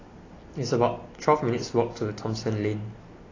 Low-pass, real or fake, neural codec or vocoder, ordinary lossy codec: 7.2 kHz; real; none; AAC, 32 kbps